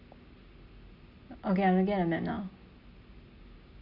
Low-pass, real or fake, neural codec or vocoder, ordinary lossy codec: 5.4 kHz; real; none; Opus, 64 kbps